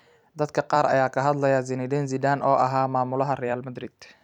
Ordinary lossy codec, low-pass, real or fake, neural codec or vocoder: none; 19.8 kHz; fake; vocoder, 44.1 kHz, 128 mel bands every 256 samples, BigVGAN v2